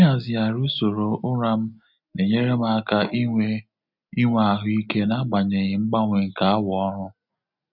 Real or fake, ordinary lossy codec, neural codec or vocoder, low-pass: real; none; none; 5.4 kHz